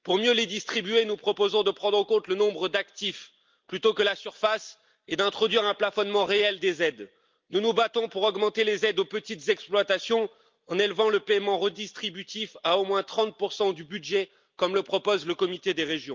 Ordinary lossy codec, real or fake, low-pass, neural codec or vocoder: Opus, 24 kbps; real; 7.2 kHz; none